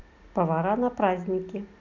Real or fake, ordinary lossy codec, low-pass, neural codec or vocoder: real; none; 7.2 kHz; none